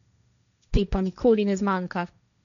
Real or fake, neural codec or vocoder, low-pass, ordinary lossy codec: fake; codec, 16 kHz, 1.1 kbps, Voila-Tokenizer; 7.2 kHz; none